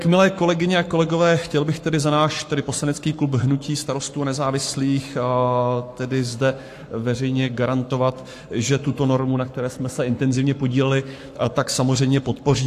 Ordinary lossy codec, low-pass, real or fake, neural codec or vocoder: AAC, 64 kbps; 14.4 kHz; fake; codec, 44.1 kHz, 7.8 kbps, Pupu-Codec